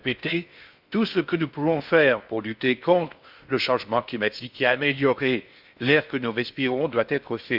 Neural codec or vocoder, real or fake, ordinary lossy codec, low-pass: codec, 16 kHz in and 24 kHz out, 0.6 kbps, FocalCodec, streaming, 4096 codes; fake; Opus, 64 kbps; 5.4 kHz